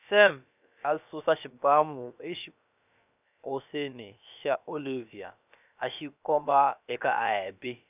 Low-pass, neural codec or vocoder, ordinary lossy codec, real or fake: 3.6 kHz; codec, 16 kHz, about 1 kbps, DyCAST, with the encoder's durations; none; fake